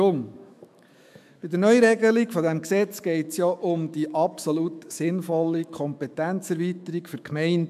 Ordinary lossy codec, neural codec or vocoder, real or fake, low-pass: MP3, 96 kbps; autoencoder, 48 kHz, 128 numbers a frame, DAC-VAE, trained on Japanese speech; fake; 14.4 kHz